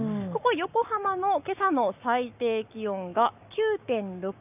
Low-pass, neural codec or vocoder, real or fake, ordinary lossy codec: 3.6 kHz; codec, 44.1 kHz, 7.8 kbps, Pupu-Codec; fake; none